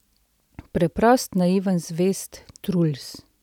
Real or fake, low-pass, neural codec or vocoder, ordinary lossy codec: real; 19.8 kHz; none; none